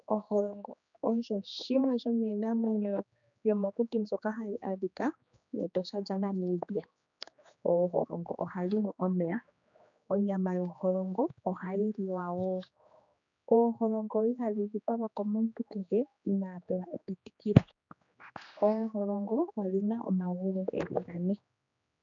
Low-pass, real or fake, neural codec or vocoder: 7.2 kHz; fake; codec, 16 kHz, 2 kbps, X-Codec, HuBERT features, trained on general audio